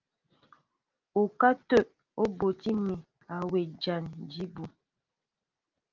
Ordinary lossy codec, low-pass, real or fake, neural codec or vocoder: Opus, 24 kbps; 7.2 kHz; real; none